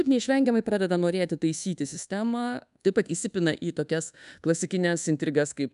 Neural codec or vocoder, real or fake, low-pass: codec, 24 kHz, 1.2 kbps, DualCodec; fake; 10.8 kHz